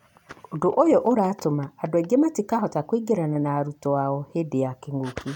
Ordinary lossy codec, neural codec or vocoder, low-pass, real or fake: none; none; 19.8 kHz; real